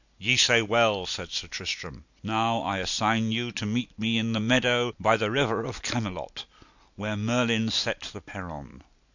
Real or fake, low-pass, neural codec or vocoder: real; 7.2 kHz; none